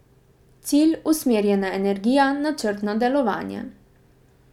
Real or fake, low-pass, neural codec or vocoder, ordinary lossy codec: real; 19.8 kHz; none; none